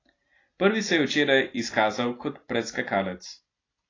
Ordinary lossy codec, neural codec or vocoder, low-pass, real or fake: AAC, 32 kbps; none; 7.2 kHz; real